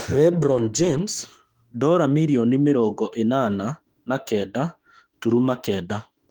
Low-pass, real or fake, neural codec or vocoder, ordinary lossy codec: 19.8 kHz; fake; autoencoder, 48 kHz, 32 numbers a frame, DAC-VAE, trained on Japanese speech; Opus, 24 kbps